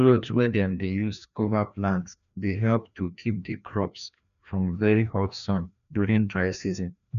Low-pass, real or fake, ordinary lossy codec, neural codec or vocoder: 7.2 kHz; fake; none; codec, 16 kHz, 1 kbps, FreqCodec, larger model